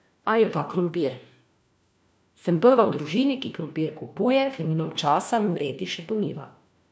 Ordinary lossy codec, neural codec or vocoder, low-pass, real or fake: none; codec, 16 kHz, 1 kbps, FunCodec, trained on LibriTTS, 50 frames a second; none; fake